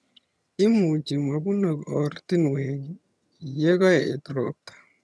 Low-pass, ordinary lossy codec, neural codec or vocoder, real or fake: none; none; vocoder, 22.05 kHz, 80 mel bands, HiFi-GAN; fake